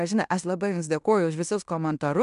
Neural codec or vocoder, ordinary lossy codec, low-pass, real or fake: codec, 16 kHz in and 24 kHz out, 0.9 kbps, LongCat-Audio-Codec, fine tuned four codebook decoder; MP3, 96 kbps; 10.8 kHz; fake